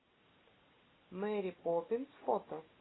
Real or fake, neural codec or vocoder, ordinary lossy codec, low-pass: real; none; AAC, 16 kbps; 7.2 kHz